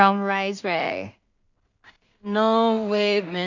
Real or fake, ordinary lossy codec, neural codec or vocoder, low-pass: fake; none; codec, 16 kHz in and 24 kHz out, 0.4 kbps, LongCat-Audio-Codec, two codebook decoder; 7.2 kHz